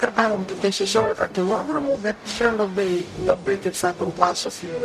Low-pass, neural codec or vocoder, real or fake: 14.4 kHz; codec, 44.1 kHz, 0.9 kbps, DAC; fake